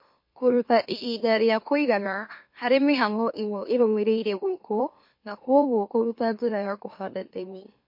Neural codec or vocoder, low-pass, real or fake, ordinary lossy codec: autoencoder, 44.1 kHz, a latent of 192 numbers a frame, MeloTTS; 5.4 kHz; fake; MP3, 32 kbps